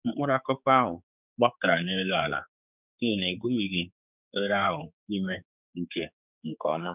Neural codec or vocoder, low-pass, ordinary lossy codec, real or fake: codec, 16 kHz, 4 kbps, X-Codec, HuBERT features, trained on general audio; 3.6 kHz; none; fake